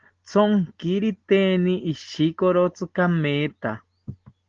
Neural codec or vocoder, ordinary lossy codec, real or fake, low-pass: none; Opus, 16 kbps; real; 7.2 kHz